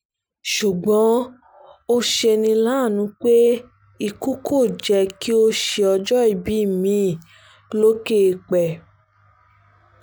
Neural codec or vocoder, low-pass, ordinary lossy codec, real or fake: none; none; none; real